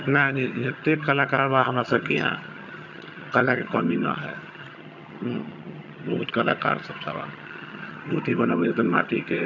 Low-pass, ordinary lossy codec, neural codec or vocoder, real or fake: 7.2 kHz; none; vocoder, 22.05 kHz, 80 mel bands, HiFi-GAN; fake